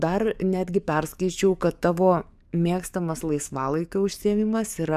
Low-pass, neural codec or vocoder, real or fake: 14.4 kHz; codec, 44.1 kHz, 7.8 kbps, DAC; fake